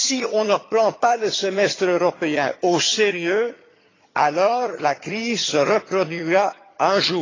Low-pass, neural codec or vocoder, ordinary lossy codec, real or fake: 7.2 kHz; vocoder, 22.05 kHz, 80 mel bands, HiFi-GAN; AAC, 32 kbps; fake